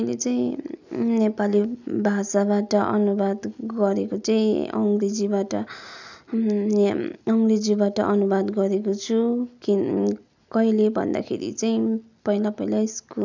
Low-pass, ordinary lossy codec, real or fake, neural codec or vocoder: 7.2 kHz; none; real; none